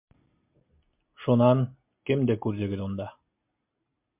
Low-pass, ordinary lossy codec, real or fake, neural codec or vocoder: 3.6 kHz; MP3, 32 kbps; real; none